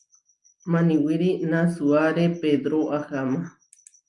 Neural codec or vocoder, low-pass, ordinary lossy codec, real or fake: none; 10.8 kHz; Opus, 32 kbps; real